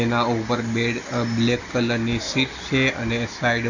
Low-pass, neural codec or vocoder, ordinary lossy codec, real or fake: 7.2 kHz; none; none; real